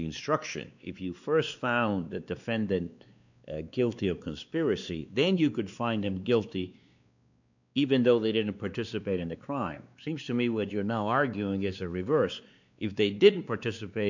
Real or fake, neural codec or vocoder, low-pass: fake; codec, 16 kHz, 2 kbps, X-Codec, WavLM features, trained on Multilingual LibriSpeech; 7.2 kHz